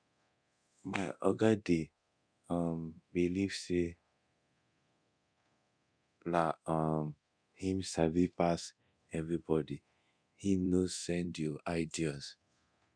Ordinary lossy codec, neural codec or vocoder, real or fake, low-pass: none; codec, 24 kHz, 0.9 kbps, DualCodec; fake; 9.9 kHz